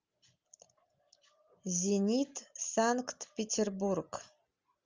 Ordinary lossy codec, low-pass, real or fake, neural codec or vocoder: Opus, 32 kbps; 7.2 kHz; real; none